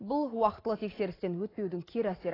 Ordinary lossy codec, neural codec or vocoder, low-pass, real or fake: AAC, 24 kbps; none; 5.4 kHz; real